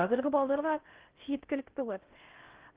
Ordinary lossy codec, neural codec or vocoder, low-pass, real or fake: Opus, 16 kbps; codec, 16 kHz in and 24 kHz out, 0.6 kbps, FocalCodec, streaming, 2048 codes; 3.6 kHz; fake